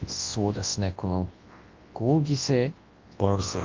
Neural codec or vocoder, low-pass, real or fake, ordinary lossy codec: codec, 24 kHz, 0.9 kbps, WavTokenizer, large speech release; 7.2 kHz; fake; Opus, 32 kbps